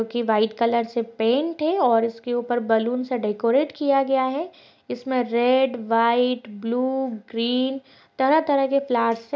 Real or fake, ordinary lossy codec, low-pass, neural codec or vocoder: real; none; none; none